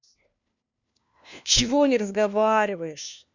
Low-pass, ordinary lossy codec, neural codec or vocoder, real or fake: 7.2 kHz; none; codec, 16 kHz, 1 kbps, FunCodec, trained on LibriTTS, 50 frames a second; fake